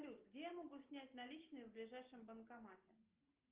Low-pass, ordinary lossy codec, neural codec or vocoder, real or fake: 3.6 kHz; Opus, 32 kbps; none; real